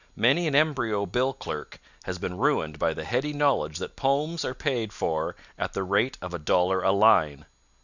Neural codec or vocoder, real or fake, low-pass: none; real; 7.2 kHz